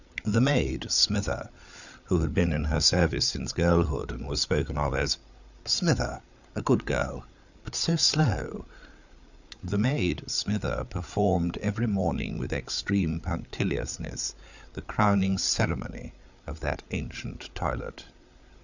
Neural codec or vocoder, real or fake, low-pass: codec, 16 kHz, 8 kbps, FreqCodec, larger model; fake; 7.2 kHz